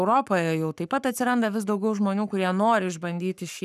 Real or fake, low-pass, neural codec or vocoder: fake; 14.4 kHz; codec, 44.1 kHz, 7.8 kbps, Pupu-Codec